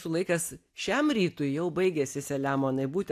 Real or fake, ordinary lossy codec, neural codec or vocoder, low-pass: real; AAC, 64 kbps; none; 14.4 kHz